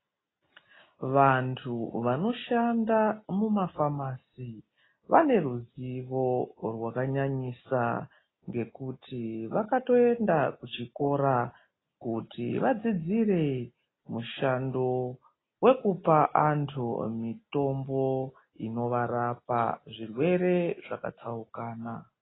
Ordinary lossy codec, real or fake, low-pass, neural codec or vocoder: AAC, 16 kbps; real; 7.2 kHz; none